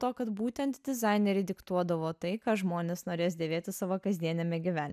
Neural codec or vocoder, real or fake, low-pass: none; real; 14.4 kHz